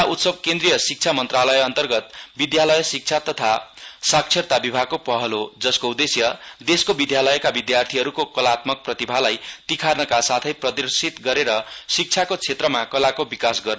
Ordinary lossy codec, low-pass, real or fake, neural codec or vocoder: none; none; real; none